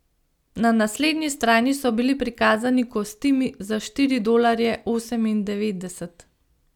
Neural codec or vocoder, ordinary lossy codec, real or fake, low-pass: none; none; real; 19.8 kHz